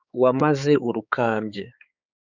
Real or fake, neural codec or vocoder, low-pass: fake; codec, 16 kHz, 4 kbps, X-Codec, HuBERT features, trained on balanced general audio; 7.2 kHz